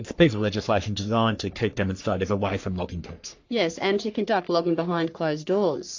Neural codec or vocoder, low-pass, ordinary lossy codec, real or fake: codec, 44.1 kHz, 3.4 kbps, Pupu-Codec; 7.2 kHz; AAC, 48 kbps; fake